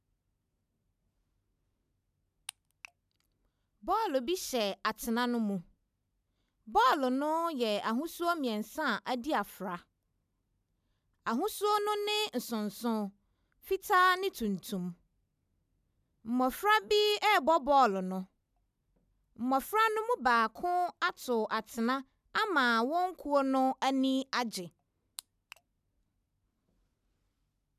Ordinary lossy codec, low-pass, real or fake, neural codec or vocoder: none; 14.4 kHz; real; none